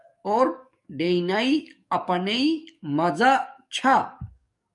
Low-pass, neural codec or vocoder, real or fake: 10.8 kHz; codec, 44.1 kHz, 7.8 kbps, DAC; fake